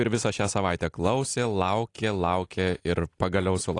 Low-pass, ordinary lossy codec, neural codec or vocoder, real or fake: 10.8 kHz; AAC, 48 kbps; none; real